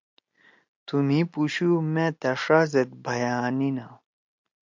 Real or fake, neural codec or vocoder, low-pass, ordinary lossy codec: real; none; 7.2 kHz; MP3, 64 kbps